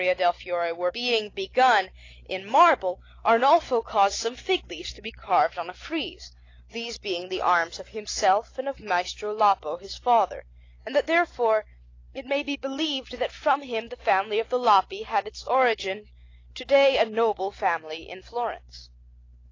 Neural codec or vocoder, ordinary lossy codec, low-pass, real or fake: none; AAC, 32 kbps; 7.2 kHz; real